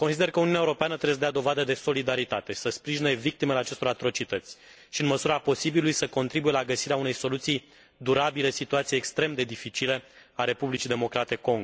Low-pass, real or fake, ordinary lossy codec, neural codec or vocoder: none; real; none; none